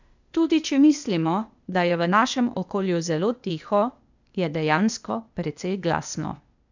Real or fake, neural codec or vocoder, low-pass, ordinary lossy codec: fake; codec, 16 kHz, 0.8 kbps, ZipCodec; 7.2 kHz; none